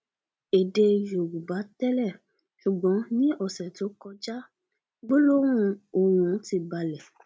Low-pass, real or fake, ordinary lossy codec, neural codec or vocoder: none; real; none; none